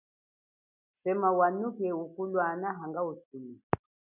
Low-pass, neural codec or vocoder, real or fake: 3.6 kHz; none; real